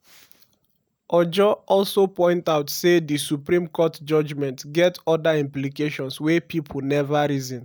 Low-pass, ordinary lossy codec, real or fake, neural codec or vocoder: none; none; real; none